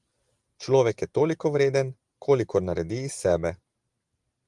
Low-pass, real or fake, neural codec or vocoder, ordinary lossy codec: 10.8 kHz; real; none; Opus, 24 kbps